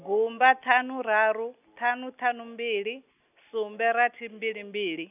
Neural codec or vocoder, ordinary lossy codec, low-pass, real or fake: none; none; 3.6 kHz; real